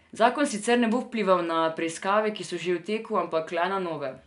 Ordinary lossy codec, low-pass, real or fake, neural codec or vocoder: none; 10.8 kHz; real; none